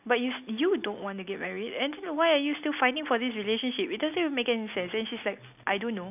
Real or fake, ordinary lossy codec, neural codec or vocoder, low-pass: real; none; none; 3.6 kHz